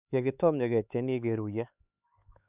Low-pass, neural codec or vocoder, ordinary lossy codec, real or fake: 3.6 kHz; codec, 16 kHz, 4 kbps, X-Codec, HuBERT features, trained on LibriSpeech; none; fake